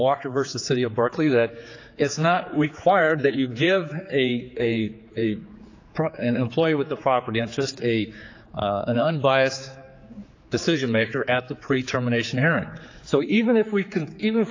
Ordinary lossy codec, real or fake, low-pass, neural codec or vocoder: AAC, 48 kbps; fake; 7.2 kHz; codec, 16 kHz, 4 kbps, X-Codec, HuBERT features, trained on general audio